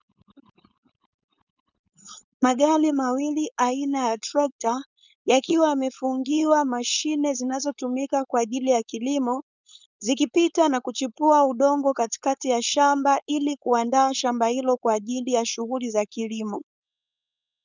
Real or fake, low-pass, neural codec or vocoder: fake; 7.2 kHz; codec, 16 kHz, 4.8 kbps, FACodec